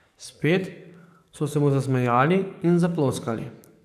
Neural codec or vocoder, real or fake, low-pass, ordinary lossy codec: codec, 44.1 kHz, 7.8 kbps, DAC; fake; 14.4 kHz; none